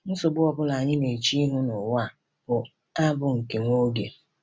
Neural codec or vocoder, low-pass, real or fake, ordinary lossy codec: none; none; real; none